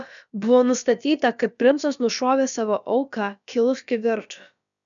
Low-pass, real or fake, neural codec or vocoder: 7.2 kHz; fake; codec, 16 kHz, about 1 kbps, DyCAST, with the encoder's durations